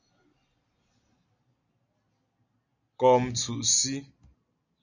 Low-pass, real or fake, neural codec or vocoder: 7.2 kHz; real; none